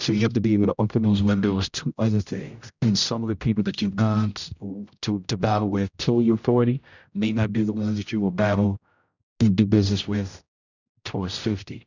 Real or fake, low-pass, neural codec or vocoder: fake; 7.2 kHz; codec, 16 kHz, 0.5 kbps, X-Codec, HuBERT features, trained on general audio